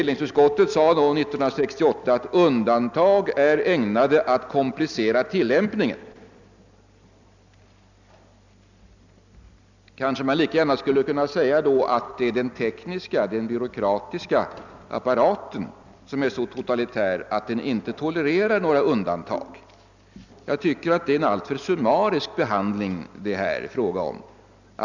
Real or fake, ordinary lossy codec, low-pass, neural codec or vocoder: real; none; 7.2 kHz; none